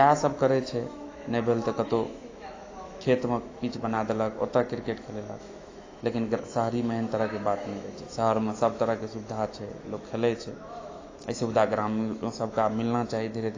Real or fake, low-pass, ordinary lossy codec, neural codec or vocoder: fake; 7.2 kHz; AAC, 32 kbps; autoencoder, 48 kHz, 128 numbers a frame, DAC-VAE, trained on Japanese speech